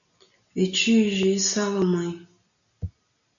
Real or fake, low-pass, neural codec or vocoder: real; 7.2 kHz; none